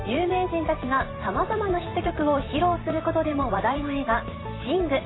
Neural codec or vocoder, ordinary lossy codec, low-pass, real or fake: vocoder, 44.1 kHz, 128 mel bands every 512 samples, BigVGAN v2; AAC, 16 kbps; 7.2 kHz; fake